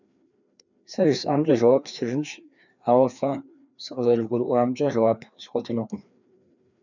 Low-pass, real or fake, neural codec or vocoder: 7.2 kHz; fake; codec, 16 kHz, 2 kbps, FreqCodec, larger model